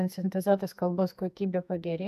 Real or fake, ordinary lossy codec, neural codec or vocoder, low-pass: fake; AAC, 96 kbps; codec, 44.1 kHz, 2.6 kbps, SNAC; 14.4 kHz